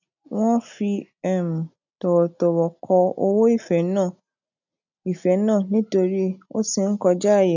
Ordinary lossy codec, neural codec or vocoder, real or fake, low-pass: none; none; real; 7.2 kHz